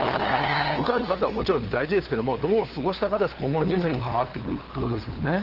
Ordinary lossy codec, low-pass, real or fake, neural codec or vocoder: Opus, 16 kbps; 5.4 kHz; fake; codec, 16 kHz, 2 kbps, FunCodec, trained on LibriTTS, 25 frames a second